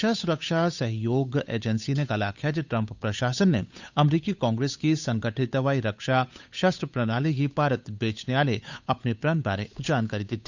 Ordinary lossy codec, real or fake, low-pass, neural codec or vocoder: none; fake; 7.2 kHz; codec, 16 kHz, 8 kbps, FunCodec, trained on Chinese and English, 25 frames a second